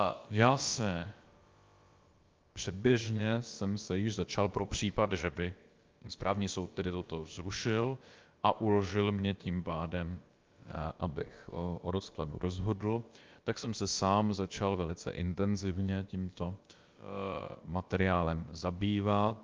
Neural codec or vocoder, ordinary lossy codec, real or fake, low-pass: codec, 16 kHz, about 1 kbps, DyCAST, with the encoder's durations; Opus, 24 kbps; fake; 7.2 kHz